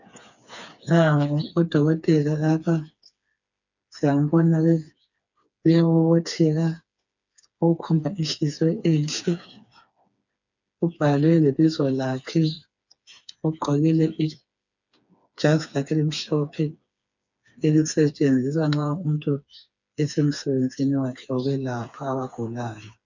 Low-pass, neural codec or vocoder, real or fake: 7.2 kHz; codec, 16 kHz, 4 kbps, FreqCodec, smaller model; fake